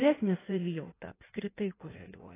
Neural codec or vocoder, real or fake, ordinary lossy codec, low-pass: codec, 16 kHz in and 24 kHz out, 1.1 kbps, FireRedTTS-2 codec; fake; AAC, 16 kbps; 3.6 kHz